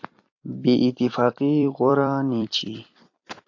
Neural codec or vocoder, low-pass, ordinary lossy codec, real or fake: vocoder, 44.1 kHz, 128 mel bands every 256 samples, BigVGAN v2; 7.2 kHz; AAC, 48 kbps; fake